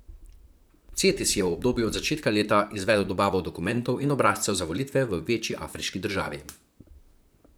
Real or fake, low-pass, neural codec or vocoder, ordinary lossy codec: fake; none; vocoder, 44.1 kHz, 128 mel bands, Pupu-Vocoder; none